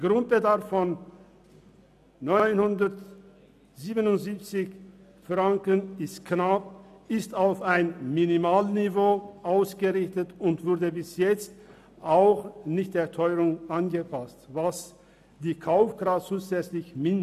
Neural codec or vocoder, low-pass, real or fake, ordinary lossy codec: none; 14.4 kHz; real; none